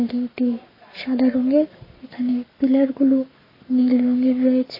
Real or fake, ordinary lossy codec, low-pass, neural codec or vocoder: fake; MP3, 24 kbps; 5.4 kHz; codec, 44.1 kHz, 7.8 kbps, Pupu-Codec